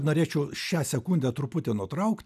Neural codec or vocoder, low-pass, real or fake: none; 14.4 kHz; real